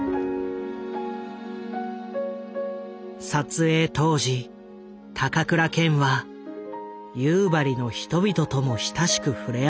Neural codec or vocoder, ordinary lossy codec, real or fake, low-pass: none; none; real; none